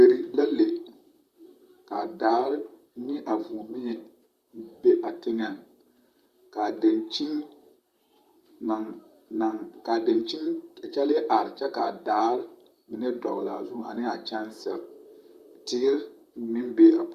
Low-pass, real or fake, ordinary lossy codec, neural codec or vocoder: 14.4 kHz; fake; Opus, 64 kbps; vocoder, 44.1 kHz, 128 mel bands, Pupu-Vocoder